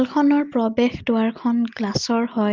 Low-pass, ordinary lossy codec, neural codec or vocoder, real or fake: 7.2 kHz; Opus, 32 kbps; none; real